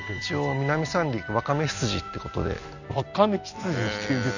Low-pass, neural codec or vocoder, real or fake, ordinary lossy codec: 7.2 kHz; none; real; none